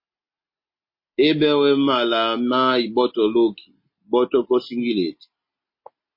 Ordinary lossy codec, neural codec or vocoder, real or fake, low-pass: MP3, 24 kbps; none; real; 5.4 kHz